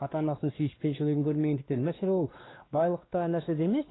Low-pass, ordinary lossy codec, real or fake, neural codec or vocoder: 7.2 kHz; AAC, 16 kbps; fake; codec, 16 kHz, 2 kbps, X-Codec, WavLM features, trained on Multilingual LibriSpeech